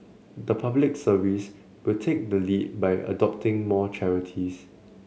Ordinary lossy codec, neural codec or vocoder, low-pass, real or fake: none; none; none; real